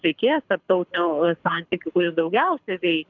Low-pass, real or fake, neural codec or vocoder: 7.2 kHz; fake; codec, 24 kHz, 6 kbps, HILCodec